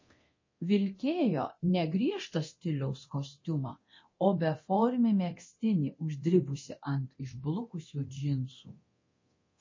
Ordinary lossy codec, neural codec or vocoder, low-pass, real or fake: MP3, 32 kbps; codec, 24 kHz, 0.9 kbps, DualCodec; 7.2 kHz; fake